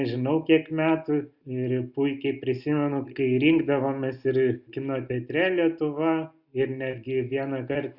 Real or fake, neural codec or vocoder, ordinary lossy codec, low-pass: real; none; Opus, 64 kbps; 5.4 kHz